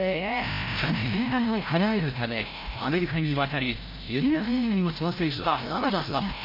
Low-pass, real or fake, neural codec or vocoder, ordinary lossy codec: 5.4 kHz; fake; codec, 16 kHz, 0.5 kbps, FreqCodec, larger model; MP3, 32 kbps